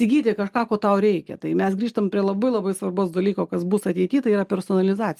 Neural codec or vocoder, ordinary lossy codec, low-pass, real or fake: none; Opus, 32 kbps; 14.4 kHz; real